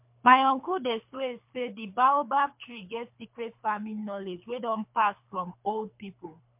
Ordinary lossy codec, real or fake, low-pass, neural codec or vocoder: MP3, 32 kbps; fake; 3.6 kHz; codec, 24 kHz, 3 kbps, HILCodec